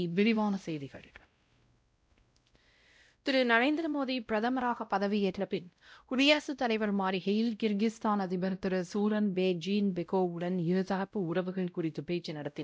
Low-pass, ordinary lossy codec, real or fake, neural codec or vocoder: none; none; fake; codec, 16 kHz, 0.5 kbps, X-Codec, WavLM features, trained on Multilingual LibriSpeech